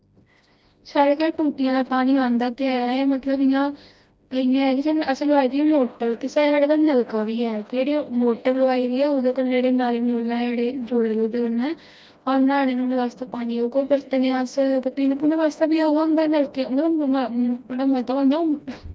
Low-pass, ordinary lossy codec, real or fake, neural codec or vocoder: none; none; fake; codec, 16 kHz, 1 kbps, FreqCodec, smaller model